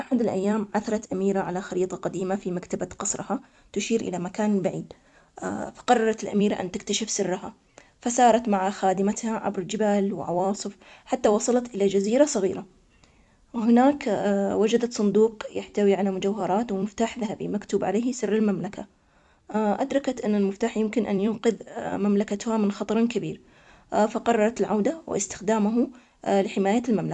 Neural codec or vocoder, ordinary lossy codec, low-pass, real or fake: vocoder, 44.1 kHz, 128 mel bands every 256 samples, BigVGAN v2; MP3, 96 kbps; 10.8 kHz; fake